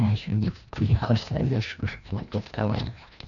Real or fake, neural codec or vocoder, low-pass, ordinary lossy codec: fake; codec, 16 kHz, 1 kbps, FreqCodec, larger model; 7.2 kHz; none